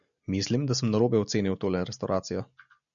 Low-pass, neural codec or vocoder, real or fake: 7.2 kHz; none; real